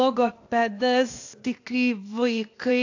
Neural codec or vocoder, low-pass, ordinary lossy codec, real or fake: codec, 16 kHz, 0.8 kbps, ZipCodec; 7.2 kHz; AAC, 48 kbps; fake